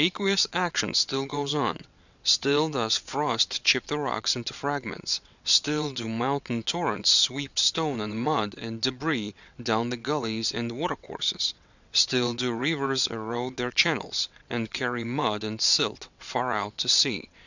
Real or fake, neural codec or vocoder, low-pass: fake; vocoder, 22.05 kHz, 80 mel bands, WaveNeXt; 7.2 kHz